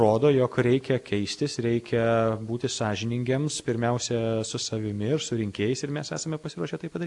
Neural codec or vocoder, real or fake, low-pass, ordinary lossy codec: none; real; 10.8 kHz; AAC, 64 kbps